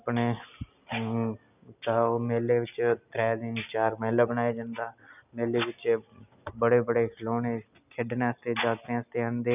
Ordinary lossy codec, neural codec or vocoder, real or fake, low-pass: none; none; real; 3.6 kHz